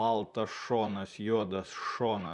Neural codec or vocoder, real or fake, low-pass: vocoder, 44.1 kHz, 128 mel bands, Pupu-Vocoder; fake; 10.8 kHz